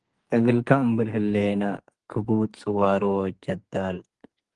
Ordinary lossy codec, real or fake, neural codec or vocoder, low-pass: Opus, 24 kbps; fake; codec, 44.1 kHz, 2.6 kbps, SNAC; 10.8 kHz